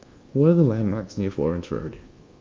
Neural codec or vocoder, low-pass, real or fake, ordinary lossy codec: codec, 24 kHz, 1.2 kbps, DualCodec; 7.2 kHz; fake; Opus, 24 kbps